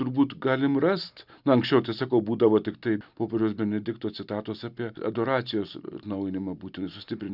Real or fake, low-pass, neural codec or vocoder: real; 5.4 kHz; none